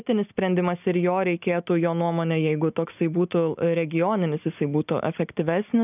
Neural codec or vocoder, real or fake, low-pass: none; real; 3.6 kHz